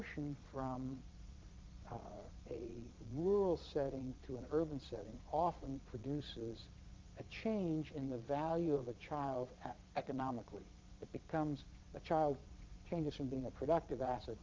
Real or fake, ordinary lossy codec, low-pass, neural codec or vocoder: fake; Opus, 32 kbps; 7.2 kHz; vocoder, 22.05 kHz, 80 mel bands, Vocos